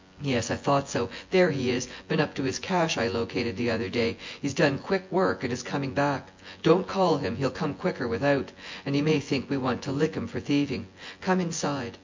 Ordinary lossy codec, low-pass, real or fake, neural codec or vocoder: MP3, 48 kbps; 7.2 kHz; fake; vocoder, 24 kHz, 100 mel bands, Vocos